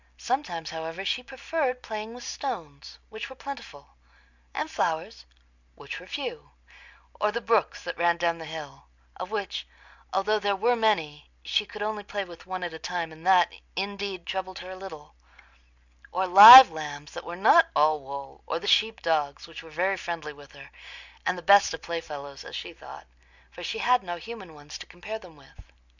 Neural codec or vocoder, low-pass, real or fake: none; 7.2 kHz; real